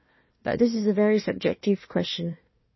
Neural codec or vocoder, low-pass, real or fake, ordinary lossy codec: codec, 16 kHz, 1 kbps, FunCodec, trained on Chinese and English, 50 frames a second; 7.2 kHz; fake; MP3, 24 kbps